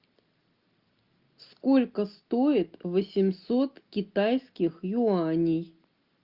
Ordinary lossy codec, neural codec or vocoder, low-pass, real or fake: Opus, 24 kbps; none; 5.4 kHz; real